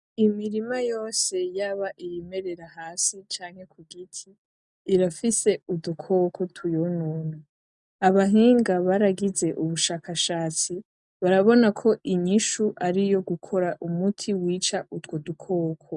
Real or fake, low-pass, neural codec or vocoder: real; 10.8 kHz; none